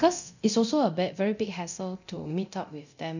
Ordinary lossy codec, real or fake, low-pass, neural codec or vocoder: none; fake; 7.2 kHz; codec, 24 kHz, 0.9 kbps, DualCodec